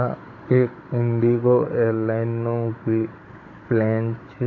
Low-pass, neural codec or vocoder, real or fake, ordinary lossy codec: 7.2 kHz; codec, 16 kHz, 16 kbps, FunCodec, trained on Chinese and English, 50 frames a second; fake; AAC, 32 kbps